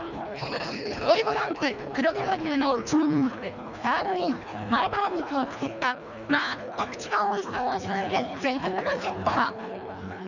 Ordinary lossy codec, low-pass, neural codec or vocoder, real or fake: none; 7.2 kHz; codec, 24 kHz, 1.5 kbps, HILCodec; fake